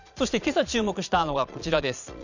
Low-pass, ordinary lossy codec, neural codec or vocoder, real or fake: 7.2 kHz; none; vocoder, 22.05 kHz, 80 mel bands, Vocos; fake